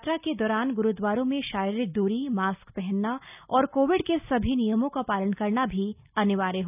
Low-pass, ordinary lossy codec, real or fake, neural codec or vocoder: 3.6 kHz; none; real; none